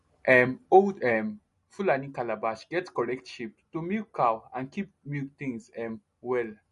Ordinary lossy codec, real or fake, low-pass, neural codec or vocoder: MP3, 48 kbps; real; 14.4 kHz; none